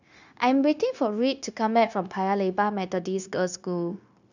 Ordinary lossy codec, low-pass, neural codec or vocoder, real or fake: none; 7.2 kHz; codec, 16 kHz, 0.9 kbps, LongCat-Audio-Codec; fake